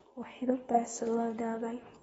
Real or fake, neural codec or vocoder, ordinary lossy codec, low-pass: fake; codec, 24 kHz, 0.9 kbps, WavTokenizer, medium speech release version 2; AAC, 24 kbps; 10.8 kHz